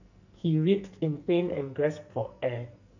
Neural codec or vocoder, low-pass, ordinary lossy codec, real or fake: codec, 44.1 kHz, 2.6 kbps, SNAC; 7.2 kHz; none; fake